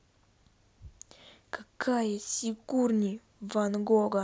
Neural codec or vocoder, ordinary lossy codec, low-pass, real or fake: none; none; none; real